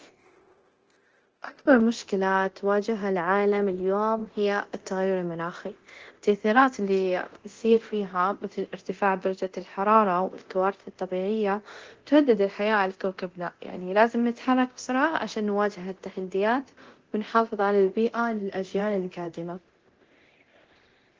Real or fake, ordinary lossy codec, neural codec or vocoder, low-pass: fake; Opus, 16 kbps; codec, 24 kHz, 0.9 kbps, DualCodec; 7.2 kHz